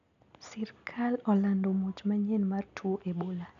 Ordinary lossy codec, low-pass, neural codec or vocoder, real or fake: none; 7.2 kHz; none; real